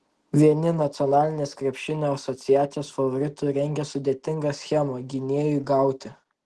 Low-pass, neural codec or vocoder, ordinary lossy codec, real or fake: 10.8 kHz; none; Opus, 16 kbps; real